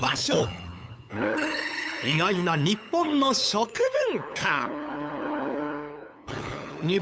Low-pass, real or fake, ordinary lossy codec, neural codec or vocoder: none; fake; none; codec, 16 kHz, 8 kbps, FunCodec, trained on LibriTTS, 25 frames a second